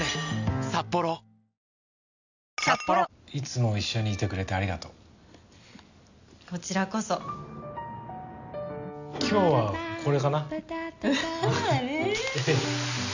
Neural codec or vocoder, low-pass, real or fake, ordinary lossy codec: none; 7.2 kHz; real; none